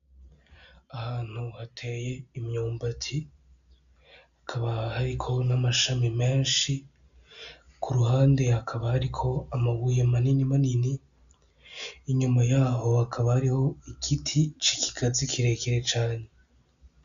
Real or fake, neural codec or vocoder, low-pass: real; none; 7.2 kHz